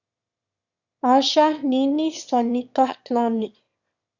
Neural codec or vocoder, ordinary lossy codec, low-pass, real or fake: autoencoder, 22.05 kHz, a latent of 192 numbers a frame, VITS, trained on one speaker; Opus, 64 kbps; 7.2 kHz; fake